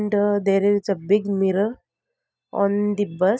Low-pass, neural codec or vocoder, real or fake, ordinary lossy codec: none; none; real; none